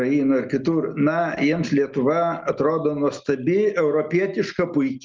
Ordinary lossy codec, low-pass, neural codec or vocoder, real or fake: Opus, 32 kbps; 7.2 kHz; none; real